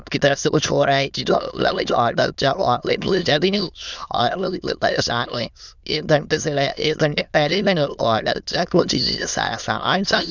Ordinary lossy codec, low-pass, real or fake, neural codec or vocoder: none; 7.2 kHz; fake; autoencoder, 22.05 kHz, a latent of 192 numbers a frame, VITS, trained on many speakers